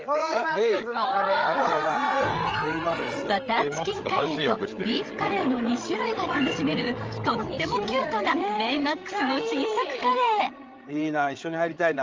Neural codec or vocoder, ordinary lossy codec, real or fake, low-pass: codec, 16 kHz, 8 kbps, FreqCodec, larger model; Opus, 24 kbps; fake; 7.2 kHz